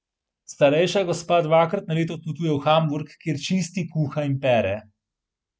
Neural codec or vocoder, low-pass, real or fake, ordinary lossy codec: none; none; real; none